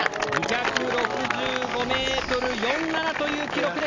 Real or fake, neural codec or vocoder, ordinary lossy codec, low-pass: real; none; AAC, 48 kbps; 7.2 kHz